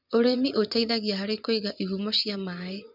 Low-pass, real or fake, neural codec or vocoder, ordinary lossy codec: 5.4 kHz; fake; vocoder, 24 kHz, 100 mel bands, Vocos; none